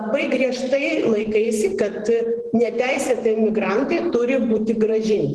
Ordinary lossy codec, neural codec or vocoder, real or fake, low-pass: Opus, 16 kbps; vocoder, 44.1 kHz, 128 mel bands every 512 samples, BigVGAN v2; fake; 10.8 kHz